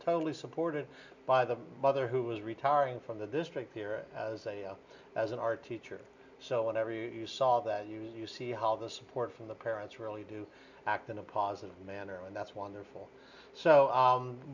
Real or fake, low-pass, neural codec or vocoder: real; 7.2 kHz; none